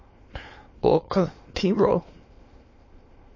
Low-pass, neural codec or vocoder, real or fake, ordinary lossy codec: 7.2 kHz; autoencoder, 22.05 kHz, a latent of 192 numbers a frame, VITS, trained on many speakers; fake; MP3, 32 kbps